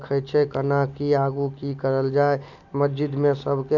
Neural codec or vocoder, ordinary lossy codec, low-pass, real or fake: none; none; 7.2 kHz; real